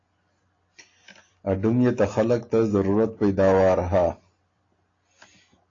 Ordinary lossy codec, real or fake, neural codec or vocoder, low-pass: AAC, 32 kbps; real; none; 7.2 kHz